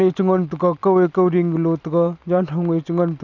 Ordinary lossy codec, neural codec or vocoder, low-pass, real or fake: none; none; 7.2 kHz; real